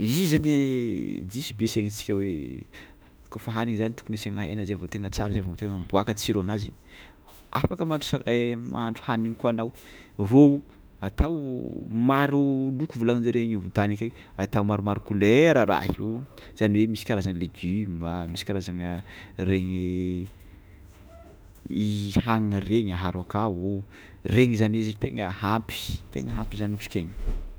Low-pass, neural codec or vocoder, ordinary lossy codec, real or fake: none; autoencoder, 48 kHz, 32 numbers a frame, DAC-VAE, trained on Japanese speech; none; fake